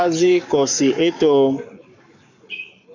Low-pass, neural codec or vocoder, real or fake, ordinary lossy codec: 7.2 kHz; codec, 44.1 kHz, 7.8 kbps, Pupu-Codec; fake; MP3, 64 kbps